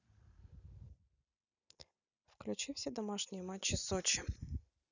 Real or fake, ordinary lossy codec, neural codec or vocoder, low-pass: real; none; none; 7.2 kHz